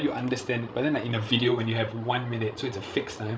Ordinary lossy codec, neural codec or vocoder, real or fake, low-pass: none; codec, 16 kHz, 16 kbps, FreqCodec, larger model; fake; none